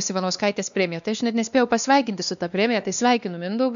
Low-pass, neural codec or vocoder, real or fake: 7.2 kHz; codec, 16 kHz, 2 kbps, X-Codec, WavLM features, trained on Multilingual LibriSpeech; fake